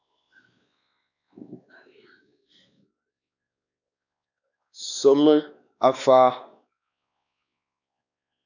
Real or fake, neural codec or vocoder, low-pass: fake; codec, 16 kHz, 2 kbps, X-Codec, WavLM features, trained on Multilingual LibriSpeech; 7.2 kHz